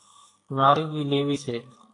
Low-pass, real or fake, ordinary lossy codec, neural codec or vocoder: 10.8 kHz; fake; AAC, 48 kbps; codec, 44.1 kHz, 2.6 kbps, SNAC